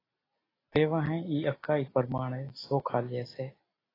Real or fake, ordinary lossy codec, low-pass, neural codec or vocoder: real; AAC, 24 kbps; 5.4 kHz; none